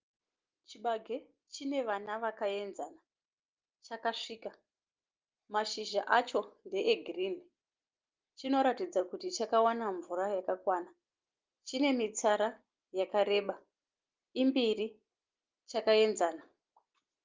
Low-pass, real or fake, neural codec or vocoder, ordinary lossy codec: 7.2 kHz; real; none; Opus, 32 kbps